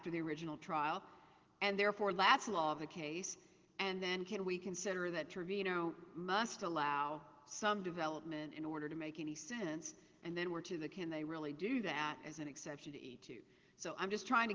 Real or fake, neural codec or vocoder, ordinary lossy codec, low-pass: real; none; Opus, 16 kbps; 7.2 kHz